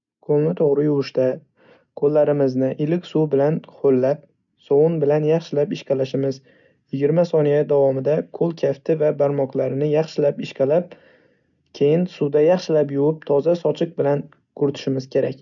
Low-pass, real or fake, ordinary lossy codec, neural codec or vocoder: 7.2 kHz; real; none; none